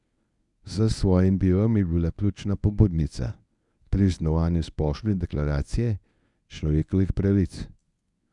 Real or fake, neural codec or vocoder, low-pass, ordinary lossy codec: fake; codec, 24 kHz, 0.9 kbps, WavTokenizer, medium speech release version 1; 10.8 kHz; none